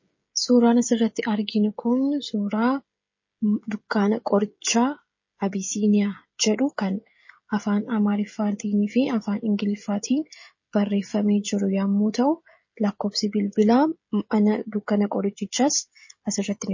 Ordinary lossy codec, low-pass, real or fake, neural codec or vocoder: MP3, 32 kbps; 7.2 kHz; fake; codec, 16 kHz, 16 kbps, FreqCodec, smaller model